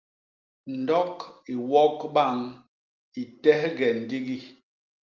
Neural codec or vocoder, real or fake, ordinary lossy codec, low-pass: none; real; Opus, 32 kbps; 7.2 kHz